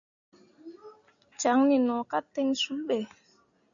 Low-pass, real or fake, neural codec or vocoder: 7.2 kHz; real; none